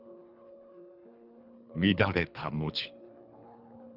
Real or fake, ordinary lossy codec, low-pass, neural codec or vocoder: fake; Opus, 64 kbps; 5.4 kHz; codec, 24 kHz, 3 kbps, HILCodec